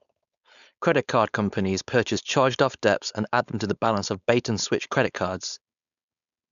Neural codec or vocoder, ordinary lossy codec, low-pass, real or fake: codec, 16 kHz, 4.8 kbps, FACodec; none; 7.2 kHz; fake